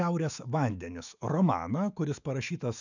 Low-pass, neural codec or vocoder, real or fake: 7.2 kHz; autoencoder, 48 kHz, 128 numbers a frame, DAC-VAE, trained on Japanese speech; fake